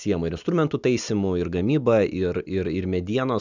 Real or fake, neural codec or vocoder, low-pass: real; none; 7.2 kHz